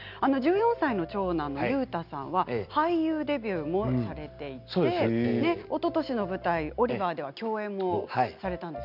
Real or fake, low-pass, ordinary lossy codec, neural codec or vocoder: real; 5.4 kHz; none; none